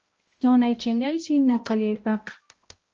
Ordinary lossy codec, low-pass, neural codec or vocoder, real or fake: Opus, 24 kbps; 7.2 kHz; codec, 16 kHz, 0.5 kbps, X-Codec, HuBERT features, trained on balanced general audio; fake